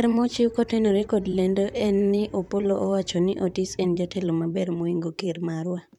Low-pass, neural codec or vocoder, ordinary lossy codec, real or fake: 19.8 kHz; vocoder, 44.1 kHz, 128 mel bands, Pupu-Vocoder; none; fake